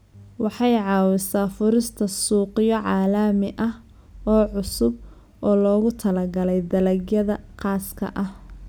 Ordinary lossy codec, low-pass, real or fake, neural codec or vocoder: none; none; real; none